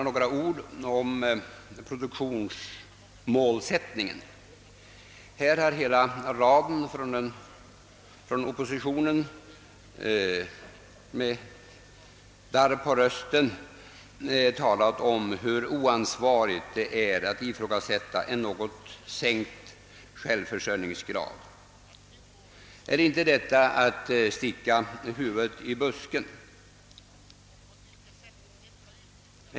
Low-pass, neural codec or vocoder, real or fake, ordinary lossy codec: none; none; real; none